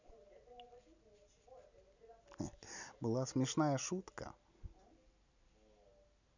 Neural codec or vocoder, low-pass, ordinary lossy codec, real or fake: none; 7.2 kHz; none; real